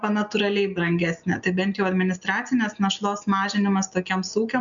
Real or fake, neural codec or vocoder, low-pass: real; none; 7.2 kHz